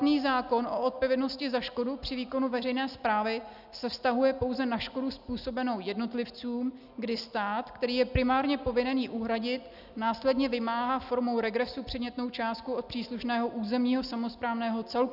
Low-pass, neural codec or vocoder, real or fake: 5.4 kHz; none; real